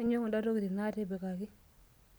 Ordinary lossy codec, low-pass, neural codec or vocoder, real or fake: none; none; none; real